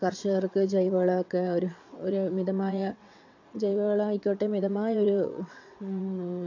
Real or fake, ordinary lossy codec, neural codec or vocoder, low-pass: fake; AAC, 48 kbps; vocoder, 22.05 kHz, 80 mel bands, WaveNeXt; 7.2 kHz